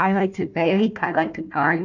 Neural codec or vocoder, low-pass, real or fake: codec, 16 kHz, 1 kbps, FunCodec, trained on Chinese and English, 50 frames a second; 7.2 kHz; fake